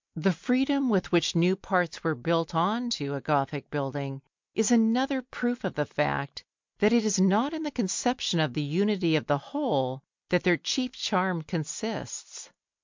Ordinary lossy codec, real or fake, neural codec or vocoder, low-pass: MP3, 64 kbps; real; none; 7.2 kHz